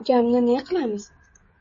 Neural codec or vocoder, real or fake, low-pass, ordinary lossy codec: codec, 16 kHz, 16 kbps, FreqCodec, smaller model; fake; 7.2 kHz; MP3, 32 kbps